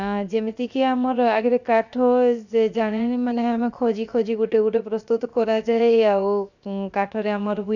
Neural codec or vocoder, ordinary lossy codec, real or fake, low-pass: codec, 16 kHz, 0.7 kbps, FocalCodec; none; fake; 7.2 kHz